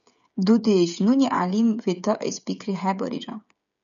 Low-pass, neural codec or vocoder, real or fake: 7.2 kHz; codec, 16 kHz, 16 kbps, FreqCodec, smaller model; fake